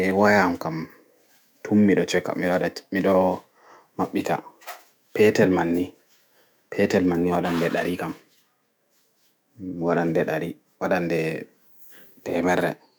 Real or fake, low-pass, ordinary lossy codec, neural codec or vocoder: fake; none; none; autoencoder, 48 kHz, 128 numbers a frame, DAC-VAE, trained on Japanese speech